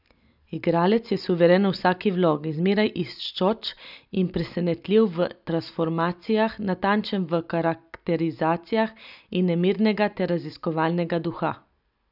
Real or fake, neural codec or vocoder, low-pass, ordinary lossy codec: real; none; 5.4 kHz; none